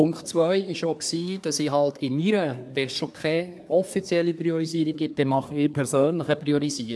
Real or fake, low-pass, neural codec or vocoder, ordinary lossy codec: fake; none; codec, 24 kHz, 1 kbps, SNAC; none